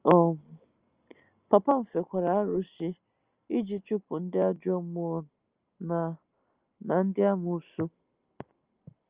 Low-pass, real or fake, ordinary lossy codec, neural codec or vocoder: 3.6 kHz; real; Opus, 24 kbps; none